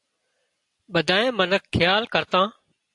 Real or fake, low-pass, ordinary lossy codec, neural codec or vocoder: real; 10.8 kHz; AAC, 64 kbps; none